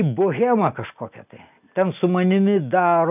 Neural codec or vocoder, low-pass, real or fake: none; 3.6 kHz; real